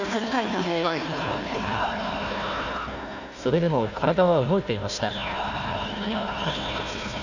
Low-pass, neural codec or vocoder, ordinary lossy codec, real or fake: 7.2 kHz; codec, 16 kHz, 1 kbps, FunCodec, trained on Chinese and English, 50 frames a second; none; fake